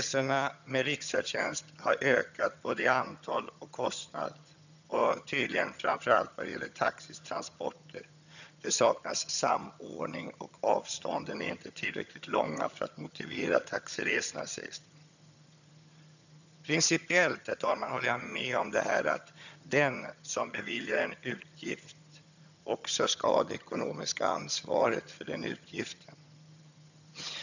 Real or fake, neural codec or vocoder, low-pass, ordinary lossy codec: fake; vocoder, 22.05 kHz, 80 mel bands, HiFi-GAN; 7.2 kHz; none